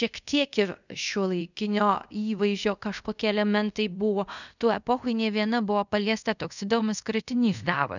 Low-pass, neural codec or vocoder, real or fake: 7.2 kHz; codec, 24 kHz, 0.5 kbps, DualCodec; fake